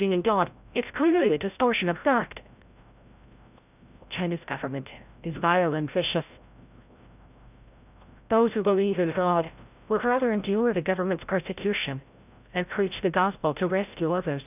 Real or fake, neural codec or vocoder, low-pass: fake; codec, 16 kHz, 0.5 kbps, FreqCodec, larger model; 3.6 kHz